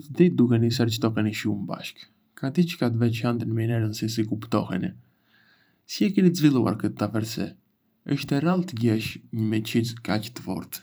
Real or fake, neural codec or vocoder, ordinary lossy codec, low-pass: fake; vocoder, 44.1 kHz, 128 mel bands every 512 samples, BigVGAN v2; none; none